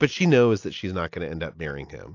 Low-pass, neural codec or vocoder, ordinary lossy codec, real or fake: 7.2 kHz; none; AAC, 48 kbps; real